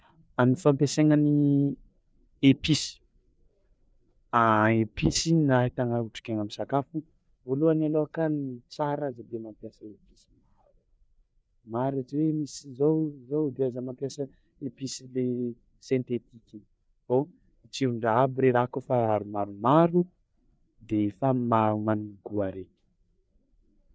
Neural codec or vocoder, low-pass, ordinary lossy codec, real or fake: codec, 16 kHz, 4 kbps, FreqCodec, larger model; none; none; fake